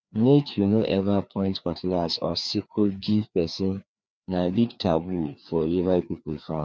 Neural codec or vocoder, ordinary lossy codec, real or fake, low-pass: codec, 16 kHz, 2 kbps, FreqCodec, larger model; none; fake; none